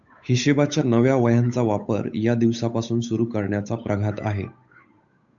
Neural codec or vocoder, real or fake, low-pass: codec, 16 kHz, 8 kbps, FunCodec, trained on Chinese and English, 25 frames a second; fake; 7.2 kHz